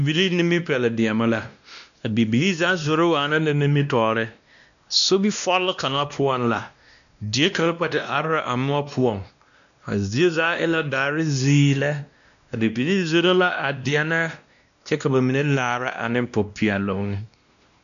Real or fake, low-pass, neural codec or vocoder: fake; 7.2 kHz; codec, 16 kHz, 1 kbps, X-Codec, WavLM features, trained on Multilingual LibriSpeech